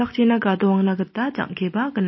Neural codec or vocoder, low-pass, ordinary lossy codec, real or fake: none; 7.2 kHz; MP3, 24 kbps; real